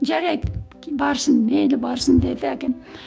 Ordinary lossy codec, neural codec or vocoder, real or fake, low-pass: none; codec, 16 kHz, 6 kbps, DAC; fake; none